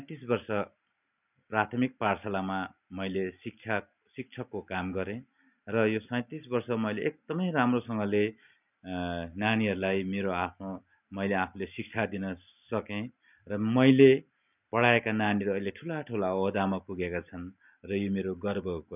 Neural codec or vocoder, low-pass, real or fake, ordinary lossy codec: none; 3.6 kHz; real; none